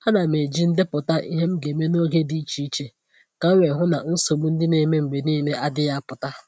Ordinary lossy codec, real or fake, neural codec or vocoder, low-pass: none; real; none; none